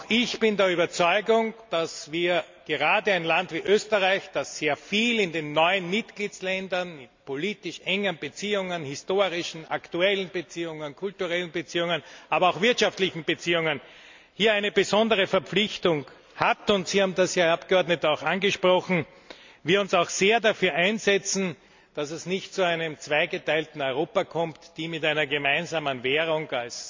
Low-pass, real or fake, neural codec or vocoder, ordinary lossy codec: 7.2 kHz; real; none; MP3, 64 kbps